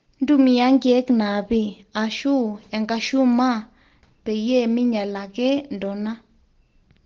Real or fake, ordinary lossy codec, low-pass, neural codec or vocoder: real; Opus, 16 kbps; 7.2 kHz; none